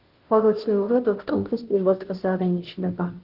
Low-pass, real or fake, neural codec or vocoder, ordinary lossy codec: 5.4 kHz; fake; codec, 16 kHz, 0.5 kbps, FunCodec, trained on Chinese and English, 25 frames a second; Opus, 16 kbps